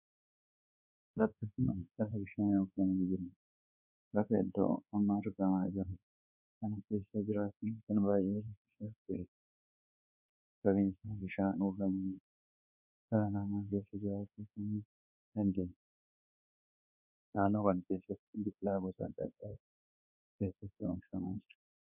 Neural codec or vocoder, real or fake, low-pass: codec, 24 kHz, 1.2 kbps, DualCodec; fake; 3.6 kHz